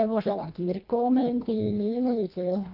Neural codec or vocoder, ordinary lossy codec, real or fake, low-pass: codec, 24 kHz, 1.5 kbps, HILCodec; Opus, 24 kbps; fake; 5.4 kHz